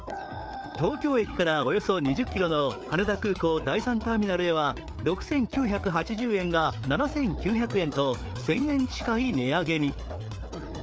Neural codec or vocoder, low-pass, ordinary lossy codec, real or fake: codec, 16 kHz, 4 kbps, FreqCodec, larger model; none; none; fake